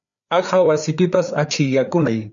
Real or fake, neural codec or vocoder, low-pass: fake; codec, 16 kHz, 4 kbps, FreqCodec, larger model; 7.2 kHz